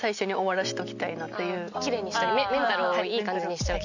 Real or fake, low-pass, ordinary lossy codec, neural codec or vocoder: real; 7.2 kHz; none; none